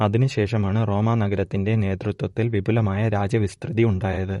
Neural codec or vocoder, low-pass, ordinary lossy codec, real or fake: vocoder, 44.1 kHz, 128 mel bands, Pupu-Vocoder; 19.8 kHz; MP3, 48 kbps; fake